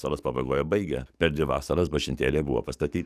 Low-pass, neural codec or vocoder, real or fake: 14.4 kHz; codec, 44.1 kHz, 7.8 kbps, DAC; fake